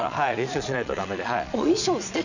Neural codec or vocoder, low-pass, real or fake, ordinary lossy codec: vocoder, 22.05 kHz, 80 mel bands, Vocos; 7.2 kHz; fake; none